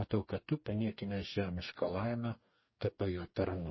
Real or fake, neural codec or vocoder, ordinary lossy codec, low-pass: fake; codec, 44.1 kHz, 2.6 kbps, DAC; MP3, 24 kbps; 7.2 kHz